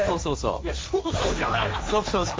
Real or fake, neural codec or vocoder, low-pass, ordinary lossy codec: fake; codec, 16 kHz, 1.1 kbps, Voila-Tokenizer; none; none